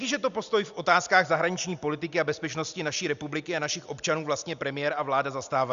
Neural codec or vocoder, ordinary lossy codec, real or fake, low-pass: none; Opus, 64 kbps; real; 7.2 kHz